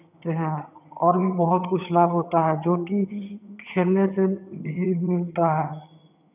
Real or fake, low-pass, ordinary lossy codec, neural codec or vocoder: fake; 3.6 kHz; none; vocoder, 22.05 kHz, 80 mel bands, HiFi-GAN